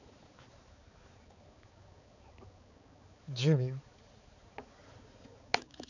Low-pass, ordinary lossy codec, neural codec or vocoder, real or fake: 7.2 kHz; none; codec, 16 kHz, 4 kbps, X-Codec, HuBERT features, trained on balanced general audio; fake